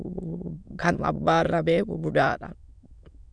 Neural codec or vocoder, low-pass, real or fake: autoencoder, 22.05 kHz, a latent of 192 numbers a frame, VITS, trained on many speakers; 9.9 kHz; fake